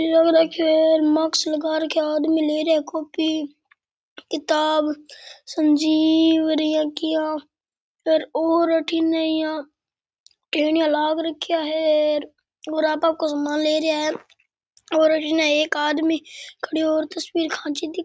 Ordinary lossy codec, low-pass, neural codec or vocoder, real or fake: none; none; none; real